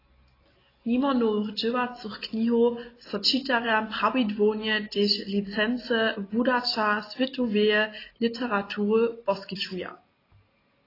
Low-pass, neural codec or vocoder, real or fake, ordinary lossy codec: 5.4 kHz; none; real; AAC, 24 kbps